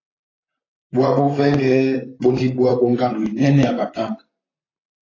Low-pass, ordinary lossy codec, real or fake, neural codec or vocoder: 7.2 kHz; AAC, 32 kbps; fake; vocoder, 44.1 kHz, 128 mel bands, Pupu-Vocoder